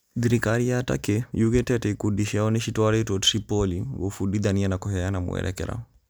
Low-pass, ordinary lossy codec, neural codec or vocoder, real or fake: none; none; none; real